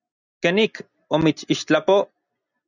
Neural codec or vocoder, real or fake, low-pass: none; real; 7.2 kHz